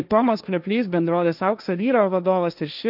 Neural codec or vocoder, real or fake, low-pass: codec, 16 kHz, 1.1 kbps, Voila-Tokenizer; fake; 5.4 kHz